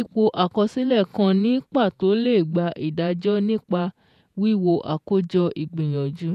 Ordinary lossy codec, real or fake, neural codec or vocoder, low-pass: none; fake; vocoder, 48 kHz, 128 mel bands, Vocos; 14.4 kHz